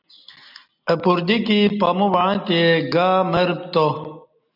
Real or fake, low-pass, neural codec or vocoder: real; 5.4 kHz; none